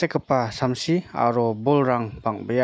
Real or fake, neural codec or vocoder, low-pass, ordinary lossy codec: real; none; none; none